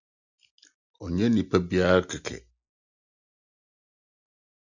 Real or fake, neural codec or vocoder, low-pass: real; none; 7.2 kHz